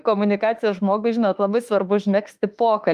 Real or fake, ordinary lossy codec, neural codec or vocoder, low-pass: fake; Opus, 32 kbps; autoencoder, 48 kHz, 32 numbers a frame, DAC-VAE, trained on Japanese speech; 14.4 kHz